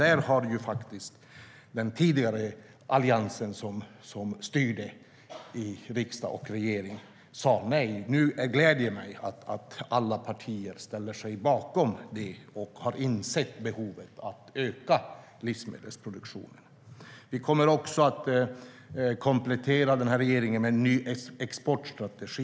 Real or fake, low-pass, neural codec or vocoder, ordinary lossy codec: real; none; none; none